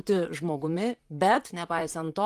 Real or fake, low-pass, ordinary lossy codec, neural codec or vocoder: fake; 14.4 kHz; Opus, 24 kbps; vocoder, 44.1 kHz, 128 mel bands, Pupu-Vocoder